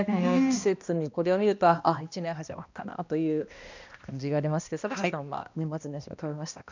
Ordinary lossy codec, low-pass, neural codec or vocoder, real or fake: none; 7.2 kHz; codec, 16 kHz, 1 kbps, X-Codec, HuBERT features, trained on balanced general audio; fake